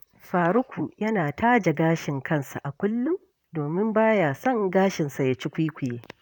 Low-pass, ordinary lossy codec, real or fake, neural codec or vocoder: 19.8 kHz; none; fake; vocoder, 44.1 kHz, 128 mel bands, Pupu-Vocoder